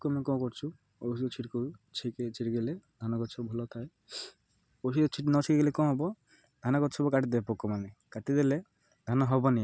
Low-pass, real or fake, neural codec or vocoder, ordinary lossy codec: none; real; none; none